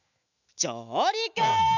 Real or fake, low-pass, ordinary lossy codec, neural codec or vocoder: fake; 7.2 kHz; none; autoencoder, 48 kHz, 128 numbers a frame, DAC-VAE, trained on Japanese speech